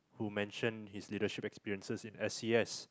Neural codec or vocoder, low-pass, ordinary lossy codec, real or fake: none; none; none; real